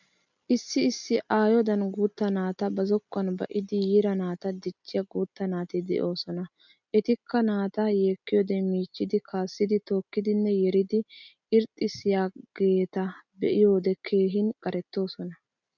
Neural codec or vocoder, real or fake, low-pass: none; real; 7.2 kHz